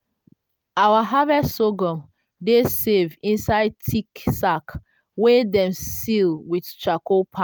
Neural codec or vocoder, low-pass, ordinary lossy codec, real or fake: none; none; none; real